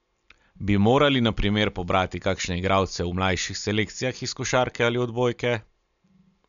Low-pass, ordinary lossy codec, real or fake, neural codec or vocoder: 7.2 kHz; MP3, 96 kbps; real; none